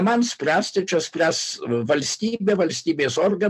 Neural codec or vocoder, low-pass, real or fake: none; 14.4 kHz; real